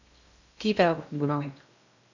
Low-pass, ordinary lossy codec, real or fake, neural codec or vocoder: 7.2 kHz; none; fake; codec, 16 kHz in and 24 kHz out, 0.6 kbps, FocalCodec, streaming, 2048 codes